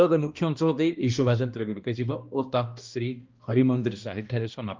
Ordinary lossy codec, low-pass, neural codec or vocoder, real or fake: Opus, 24 kbps; 7.2 kHz; codec, 16 kHz, 1 kbps, X-Codec, HuBERT features, trained on balanced general audio; fake